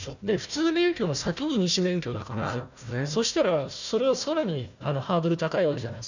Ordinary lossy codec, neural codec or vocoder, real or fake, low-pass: none; codec, 16 kHz, 1 kbps, FunCodec, trained on Chinese and English, 50 frames a second; fake; 7.2 kHz